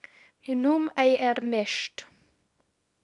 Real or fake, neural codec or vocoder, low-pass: fake; codec, 24 kHz, 0.9 kbps, WavTokenizer, small release; 10.8 kHz